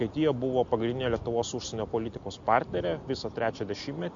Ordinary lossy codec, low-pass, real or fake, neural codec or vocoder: MP3, 48 kbps; 7.2 kHz; real; none